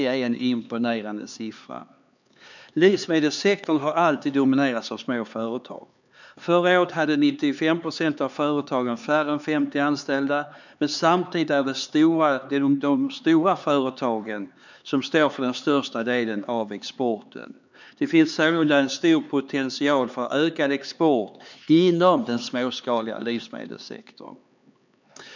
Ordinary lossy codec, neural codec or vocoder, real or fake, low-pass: none; codec, 16 kHz, 4 kbps, X-Codec, HuBERT features, trained on LibriSpeech; fake; 7.2 kHz